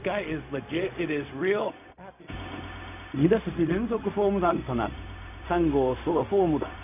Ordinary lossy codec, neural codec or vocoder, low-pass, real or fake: none; codec, 16 kHz, 0.4 kbps, LongCat-Audio-Codec; 3.6 kHz; fake